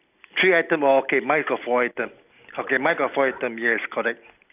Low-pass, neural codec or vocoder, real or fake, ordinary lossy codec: 3.6 kHz; none; real; none